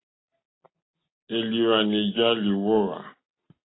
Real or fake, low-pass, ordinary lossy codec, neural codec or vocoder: real; 7.2 kHz; AAC, 16 kbps; none